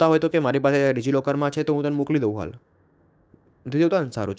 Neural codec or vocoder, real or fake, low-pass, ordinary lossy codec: codec, 16 kHz, 6 kbps, DAC; fake; none; none